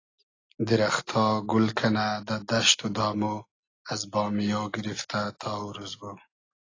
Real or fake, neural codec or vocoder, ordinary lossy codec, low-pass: real; none; AAC, 32 kbps; 7.2 kHz